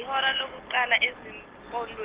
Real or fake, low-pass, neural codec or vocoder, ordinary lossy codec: real; 3.6 kHz; none; Opus, 16 kbps